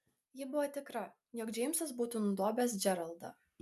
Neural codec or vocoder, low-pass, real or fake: none; 14.4 kHz; real